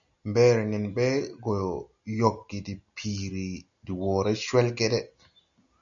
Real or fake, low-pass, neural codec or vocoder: real; 7.2 kHz; none